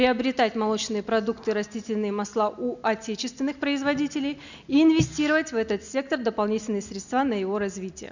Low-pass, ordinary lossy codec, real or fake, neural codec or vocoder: 7.2 kHz; none; real; none